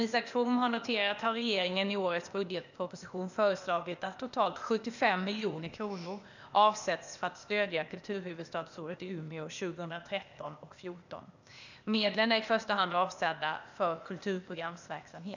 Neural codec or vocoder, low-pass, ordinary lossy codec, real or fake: codec, 16 kHz, 0.8 kbps, ZipCodec; 7.2 kHz; none; fake